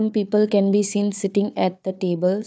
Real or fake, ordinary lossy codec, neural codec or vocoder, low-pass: fake; none; codec, 16 kHz, 4 kbps, FunCodec, trained on Chinese and English, 50 frames a second; none